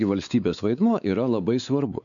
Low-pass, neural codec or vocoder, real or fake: 7.2 kHz; codec, 16 kHz, 4 kbps, X-Codec, WavLM features, trained on Multilingual LibriSpeech; fake